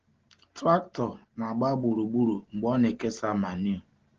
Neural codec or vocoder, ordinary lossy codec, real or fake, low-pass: none; Opus, 16 kbps; real; 7.2 kHz